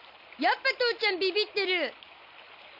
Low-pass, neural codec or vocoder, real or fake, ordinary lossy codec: 5.4 kHz; none; real; none